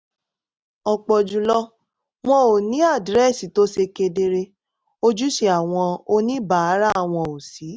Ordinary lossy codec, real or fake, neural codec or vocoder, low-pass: none; real; none; none